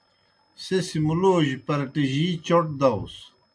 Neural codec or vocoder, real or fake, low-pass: none; real; 9.9 kHz